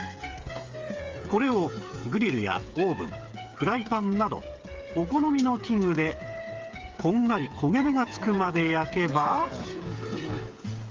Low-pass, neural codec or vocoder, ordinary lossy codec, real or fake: 7.2 kHz; codec, 16 kHz, 8 kbps, FreqCodec, smaller model; Opus, 32 kbps; fake